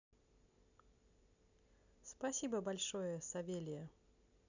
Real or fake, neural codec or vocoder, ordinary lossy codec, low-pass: real; none; none; 7.2 kHz